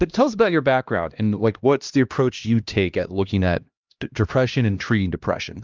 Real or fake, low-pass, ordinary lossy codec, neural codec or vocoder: fake; 7.2 kHz; Opus, 24 kbps; codec, 16 kHz, 1 kbps, X-Codec, HuBERT features, trained on LibriSpeech